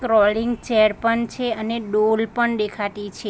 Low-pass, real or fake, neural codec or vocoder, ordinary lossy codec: none; real; none; none